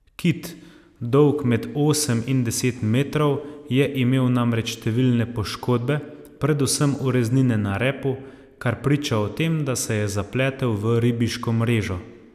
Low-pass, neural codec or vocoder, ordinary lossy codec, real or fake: 14.4 kHz; none; none; real